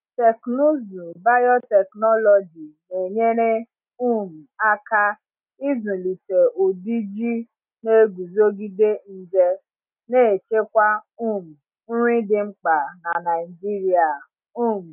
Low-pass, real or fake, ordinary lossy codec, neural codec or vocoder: 3.6 kHz; real; none; none